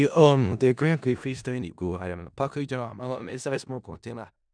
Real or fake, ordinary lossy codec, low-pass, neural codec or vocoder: fake; none; 9.9 kHz; codec, 16 kHz in and 24 kHz out, 0.4 kbps, LongCat-Audio-Codec, four codebook decoder